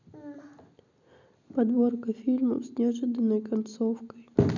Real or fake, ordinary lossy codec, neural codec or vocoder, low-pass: real; none; none; 7.2 kHz